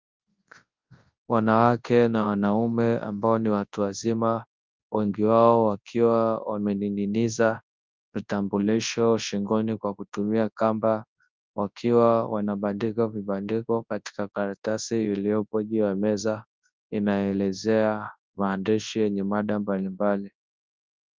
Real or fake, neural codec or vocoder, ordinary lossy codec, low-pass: fake; codec, 24 kHz, 0.9 kbps, WavTokenizer, large speech release; Opus, 24 kbps; 7.2 kHz